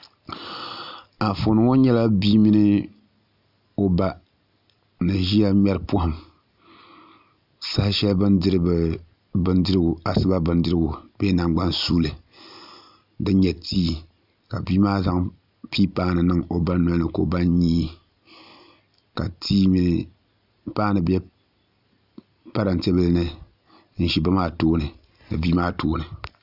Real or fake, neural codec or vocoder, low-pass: real; none; 5.4 kHz